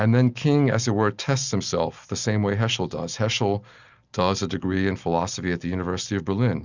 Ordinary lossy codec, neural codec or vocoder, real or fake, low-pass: Opus, 64 kbps; none; real; 7.2 kHz